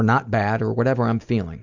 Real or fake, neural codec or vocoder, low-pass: real; none; 7.2 kHz